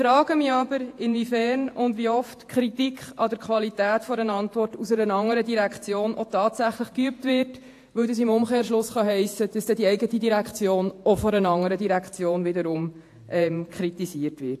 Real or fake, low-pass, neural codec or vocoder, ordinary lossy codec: fake; 14.4 kHz; vocoder, 48 kHz, 128 mel bands, Vocos; AAC, 64 kbps